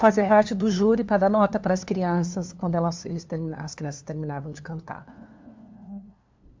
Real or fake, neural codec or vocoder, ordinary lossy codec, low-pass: fake; codec, 16 kHz, 2 kbps, FunCodec, trained on LibriTTS, 25 frames a second; MP3, 64 kbps; 7.2 kHz